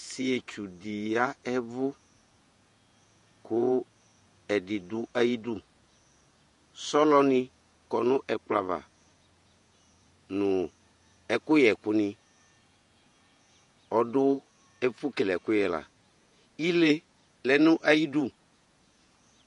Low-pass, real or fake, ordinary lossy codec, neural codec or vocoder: 14.4 kHz; fake; MP3, 48 kbps; vocoder, 48 kHz, 128 mel bands, Vocos